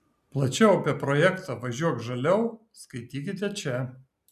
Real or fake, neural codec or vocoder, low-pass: real; none; 14.4 kHz